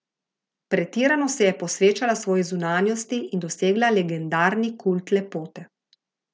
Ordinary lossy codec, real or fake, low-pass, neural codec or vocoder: none; real; none; none